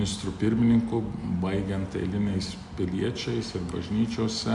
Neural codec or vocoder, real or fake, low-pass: none; real; 10.8 kHz